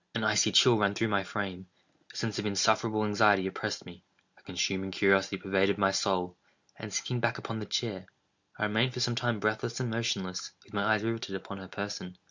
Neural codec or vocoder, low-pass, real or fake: none; 7.2 kHz; real